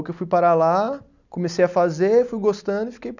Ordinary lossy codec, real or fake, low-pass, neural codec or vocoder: none; real; 7.2 kHz; none